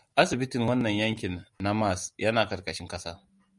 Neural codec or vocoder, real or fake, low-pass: none; real; 10.8 kHz